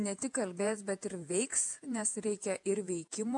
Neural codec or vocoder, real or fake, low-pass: vocoder, 48 kHz, 128 mel bands, Vocos; fake; 10.8 kHz